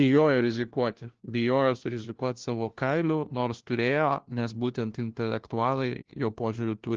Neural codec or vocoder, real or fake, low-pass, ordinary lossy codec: codec, 16 kHz, 1 kbps, FunCodec, trained on LibriTTS, 50 frames a second; fake; 7.2 kHz; Opus, 16 kbps